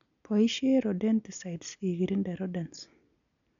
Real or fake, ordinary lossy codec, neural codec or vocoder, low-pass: real; none; none; 7.2 kHz